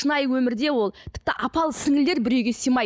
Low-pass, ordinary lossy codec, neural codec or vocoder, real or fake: none; none; none; real